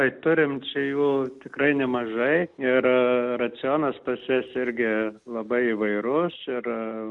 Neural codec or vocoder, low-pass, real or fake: none; 10.8 kHz; real